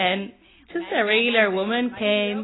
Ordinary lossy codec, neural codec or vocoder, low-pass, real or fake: AAC, 16 kbps; none; 7.2 kHz; real